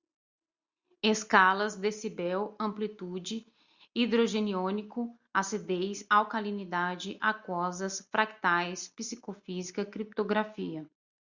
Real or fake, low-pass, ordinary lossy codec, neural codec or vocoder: real; 7.2 kHz; Opus, 64 kbps; none